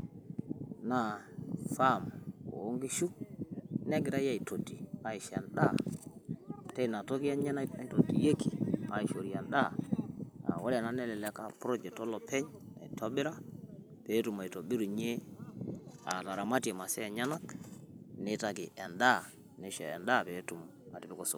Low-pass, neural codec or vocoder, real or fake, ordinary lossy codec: none; none; real; none